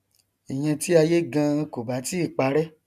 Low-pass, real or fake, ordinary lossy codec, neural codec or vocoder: 14.4 kHz; real; none; none